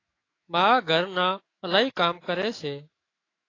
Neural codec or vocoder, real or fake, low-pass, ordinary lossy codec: autoencoder, 48 kHz, 128 numbers a frame, DAC-VAE, trained on Japanese speech; fake; 7.2 kHz; AAC, 32 kbps